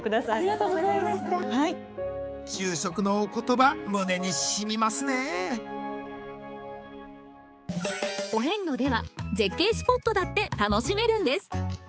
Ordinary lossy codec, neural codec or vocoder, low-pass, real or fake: none; codec, 16 kHz, 4 kbps, X-Codec, HuBERT features, trained on balanced general audio; none; fake